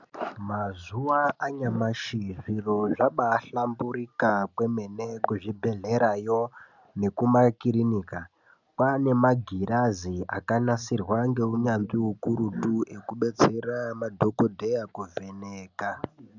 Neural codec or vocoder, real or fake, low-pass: none; real; 7.2 kHz